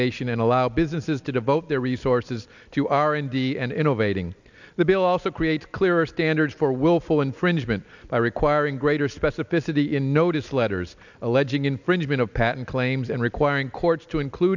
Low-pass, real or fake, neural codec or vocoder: 7.2 kHz; real; none